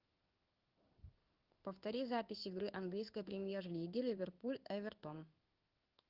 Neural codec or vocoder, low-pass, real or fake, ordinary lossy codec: codec, 16 kHz in and 24 kHz out, 1 kbps, XY-Tokenizer; 5.4 kHz; fake; Opus, 32 kbps